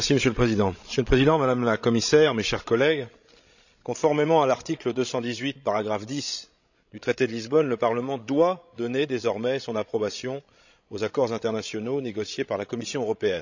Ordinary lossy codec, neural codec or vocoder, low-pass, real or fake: none; codec, 16 kHz, 16 kbps, FreqCodec, larger model; 7.2 kHz; fake